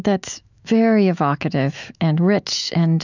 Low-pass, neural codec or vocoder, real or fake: 7.2 kHz; autoencoder, 48 kHz, 128 numbers a frame, DAC-VAE, trained on Japanese speech; fake